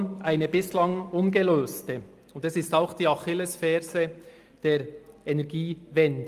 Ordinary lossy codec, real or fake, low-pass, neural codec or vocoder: Opus, 32 kbps; real; 14.4 kHz; none